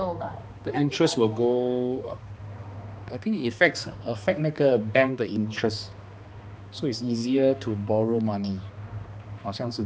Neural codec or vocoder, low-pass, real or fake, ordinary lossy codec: codec, 16 kHz, 2 kbps, X-Codec, HuBERT features, trained on balanced general audio; none; fake; none